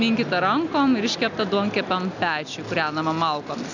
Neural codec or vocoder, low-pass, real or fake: none; 7.2 kHz; real